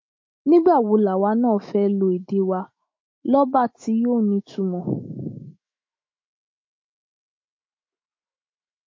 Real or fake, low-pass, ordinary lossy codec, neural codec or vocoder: fake; 7.2 kHz; MP3, 32 kbps; autoencoder, 48 kHz, 128 numbers a frame, DAC-VAE, trained on Japanese speech